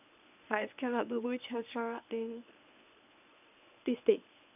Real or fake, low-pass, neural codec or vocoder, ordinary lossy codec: fake; 3.6 kHz; codec, 24 kHz, 0.9 kbps, WavTokenizer, medium speech release version 1; none